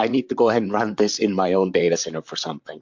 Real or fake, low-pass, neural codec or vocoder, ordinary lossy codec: fake; 7.2 kHz; vocoder, 44.1 kHz, 128 mel bands, Pupu-Vocoder; MP3, 64 kbps